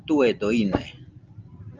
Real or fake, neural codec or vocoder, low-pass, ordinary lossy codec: real; none; 7.2 kHz; Opus, 24 kbps